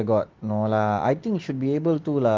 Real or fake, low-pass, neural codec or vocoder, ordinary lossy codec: real; 7.2 kHz; none; Opus, 32 kbps